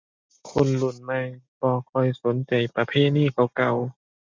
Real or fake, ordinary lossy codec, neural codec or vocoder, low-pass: real; MP3, 64 kbps; none; 7.2 kHz